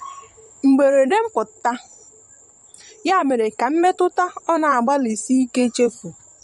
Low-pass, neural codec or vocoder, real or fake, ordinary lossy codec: 19.8 kHz; vocoder, 44.1 kHz, 128 mel bands, Pupu-Vocoder; fake; MP3, 48 kbps